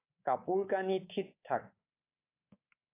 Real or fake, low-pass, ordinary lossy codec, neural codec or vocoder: fake; 3.6 kHz; AAC, 24 kbps; codec, 24 kHz, 3.1 kbps, DualCodec